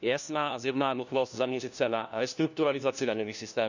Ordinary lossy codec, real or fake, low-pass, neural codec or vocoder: none; fake; 7.2 kHz; codec, 16 kHz, 1 kbps, FunCodec, trained on LibriTTS, 50 frames a second